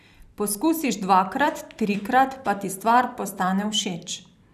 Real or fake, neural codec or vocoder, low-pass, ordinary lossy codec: fake; vocoder, 44.1 kHz, 128 mel bands every 256 samples, BigVGAN v2; 14.4 kHz; none